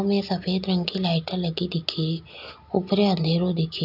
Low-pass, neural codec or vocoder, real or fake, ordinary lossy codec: 5.4 kHz; none; real; none